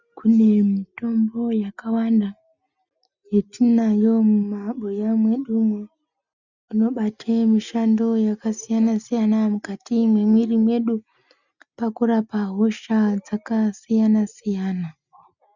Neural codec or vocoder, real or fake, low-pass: none; real; 7.2 kHz